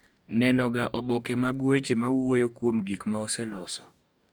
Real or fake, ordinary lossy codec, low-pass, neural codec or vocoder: fake; none; none; codec, 44.1 kHz, 2.6 kbps, DAC